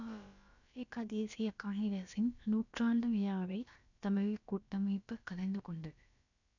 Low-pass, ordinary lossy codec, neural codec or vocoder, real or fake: 7.2 kHz; none; codec, 16 kHz, about 1 kbps, DyCAST, with the encoder's durations; fake